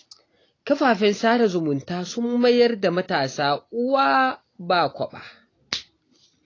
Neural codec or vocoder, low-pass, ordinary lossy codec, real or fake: none; 7.2 kHz; AAC, 32 kbps; real